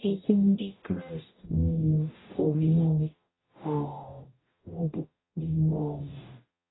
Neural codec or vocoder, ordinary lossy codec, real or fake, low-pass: codec, 44.1 kHz, 0.9 kbps, DAC; AAC, 16 kbps; fake; 7.2 kHz